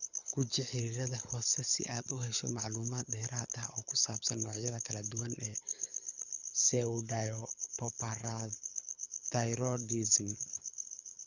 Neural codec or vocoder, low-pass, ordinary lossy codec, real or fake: codec, 24 kHz, 6 kbps, HILCodec; 7.2 kHz; none; fake